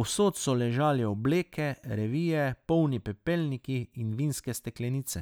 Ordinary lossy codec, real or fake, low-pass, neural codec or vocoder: none; real; none; none